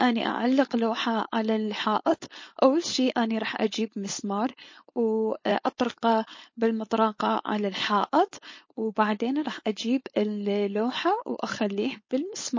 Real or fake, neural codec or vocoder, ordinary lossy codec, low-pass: fake; codec, 16 kHz, 4.8 kbps, FACodec; MP3, 32 kbps; 7.2 kHz